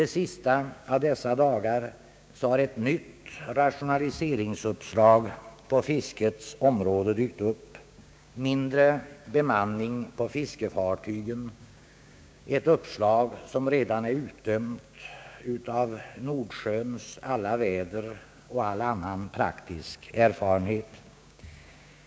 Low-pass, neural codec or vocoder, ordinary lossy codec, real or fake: none; codec, 16 kHz, 6 kbps, DAC; none; fake